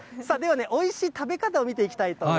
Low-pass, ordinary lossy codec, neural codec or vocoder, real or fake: none; none; none; real